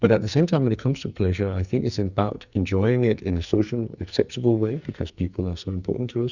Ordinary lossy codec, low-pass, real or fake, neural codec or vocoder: Opus, 64 kbps; 7.2 kHz; fake; codec, 44.1 kHz, 2.6 kbps, SNAC